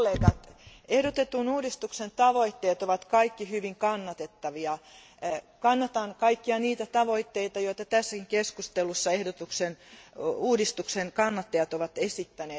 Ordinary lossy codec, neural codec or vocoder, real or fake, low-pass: none; none; real; none